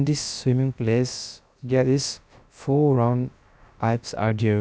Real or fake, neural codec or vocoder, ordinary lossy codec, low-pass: fake; codec, 16 kHz, about 1 kbps, DyCAST, with the encoder's durations; none; none